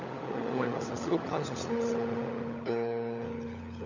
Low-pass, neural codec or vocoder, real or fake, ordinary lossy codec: 7.2 kHz; codec, 16 kHz, 16 kbps, FunCodec, trained on LibriTTS, 50 frames a second; fake; none